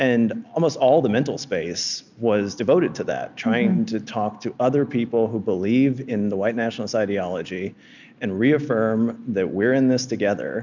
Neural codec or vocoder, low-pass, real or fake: none; 7.2 kHz; real